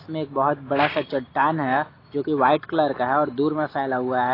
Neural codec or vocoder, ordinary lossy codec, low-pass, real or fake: none; AAC, 24 kbps; 5.4 kHz; real